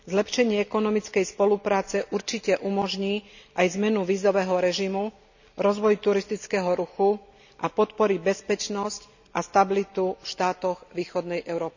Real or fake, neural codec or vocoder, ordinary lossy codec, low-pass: real; none; none; 7.2 kHz